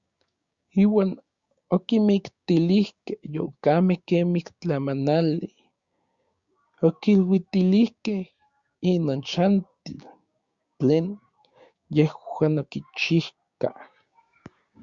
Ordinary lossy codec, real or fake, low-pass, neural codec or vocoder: Opus, 64 kbps; fake; 7.2 kHz; codec, 16 kHz, 6 kbps, DAC